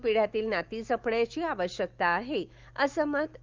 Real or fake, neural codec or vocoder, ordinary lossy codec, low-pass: fake; autoencoder, 48 kHz, 128 numbers a frame, DAC-VAE, trained on Japanese speech; Opus, 32 kbps; 7.2 kHz